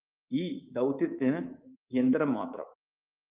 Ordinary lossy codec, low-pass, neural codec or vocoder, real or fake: Opus, 32 kbps; 3.6 kHz; codec, 24 kHz, 3.1 kbps, DualCodec; fake